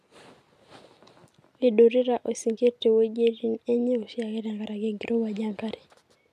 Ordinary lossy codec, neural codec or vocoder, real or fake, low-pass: none; none; real; 14.4 kHz